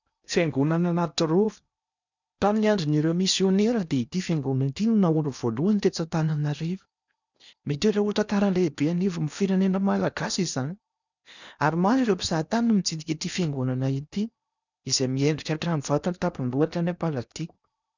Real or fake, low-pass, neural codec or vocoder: fake; 7.2 kHz; codec, 16 kHz in and 24 kHz out, 0.8 kbps, FocalCodec, streaming, 65536 codes